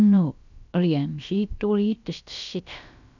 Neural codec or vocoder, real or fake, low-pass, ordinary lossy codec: codec, 16 kHz, about 1 kbps, DyCAST, with the encoder's durations; fake; 7.2 kHz; none